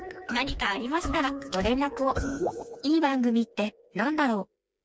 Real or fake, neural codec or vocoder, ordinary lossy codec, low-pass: fake; codec, 16 kHz, 2 kbps, FreqCodec, smaller model; none; none